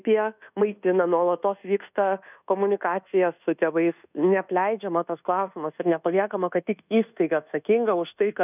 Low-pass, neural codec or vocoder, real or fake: 3.6 kHz; codec, 24 kHz, 1.2 kbps, DualCodec; fake